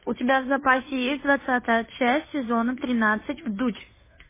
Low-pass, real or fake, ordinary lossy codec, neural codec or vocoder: 3.6 kHz; fake; MP3, 16 kbps; codec, 16 kHz, 2 kbps, FunCodec, trained on Chinese and English, 25 frames a second